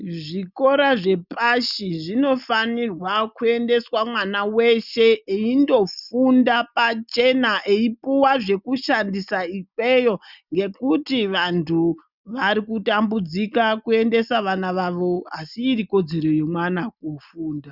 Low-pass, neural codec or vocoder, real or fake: 5.4 kHz; none; real